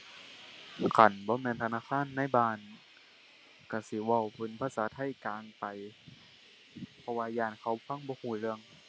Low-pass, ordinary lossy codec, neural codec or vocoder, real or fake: none; none; none; real